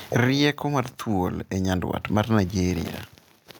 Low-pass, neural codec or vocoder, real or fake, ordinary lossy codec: none; none; real; none